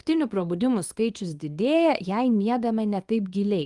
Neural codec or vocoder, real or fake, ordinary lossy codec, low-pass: codec, 24 kHz, 0.9 kbps, WavTokenizer, small release; fake; Opus, 32 kbps; 10.8 kHz